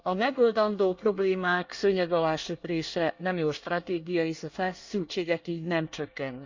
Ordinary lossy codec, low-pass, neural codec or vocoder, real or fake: Opus, 64 kbps; 7.2 kHz; codec, 24 kHz, 1 kbps, SNAC; fake